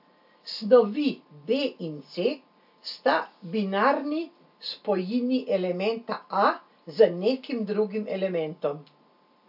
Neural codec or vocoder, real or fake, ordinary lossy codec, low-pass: none; real; AAC, 48 kbps; 5.4 kHz